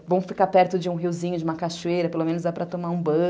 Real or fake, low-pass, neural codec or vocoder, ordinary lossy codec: real; none; none; none